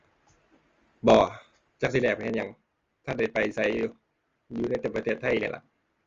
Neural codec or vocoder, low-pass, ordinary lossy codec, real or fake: none; 7.2 kHz; Opus, 32 kbps; real